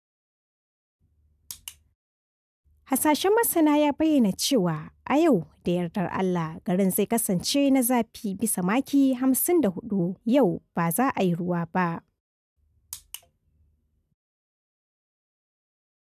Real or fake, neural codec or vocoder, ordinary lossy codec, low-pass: real; none; none; 14.4 kHz